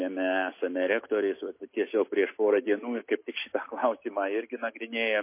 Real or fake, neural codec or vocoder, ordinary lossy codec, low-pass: real; none; MP3, 24 kbps; 3.6 kHz